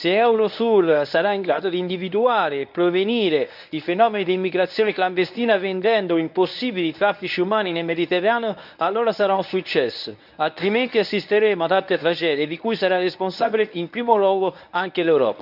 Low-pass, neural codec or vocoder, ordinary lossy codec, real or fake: 5.4 kHz; codec, 24 kHz, 0.9 kbps, WavTokenizer, medium speech release version 1; none; fake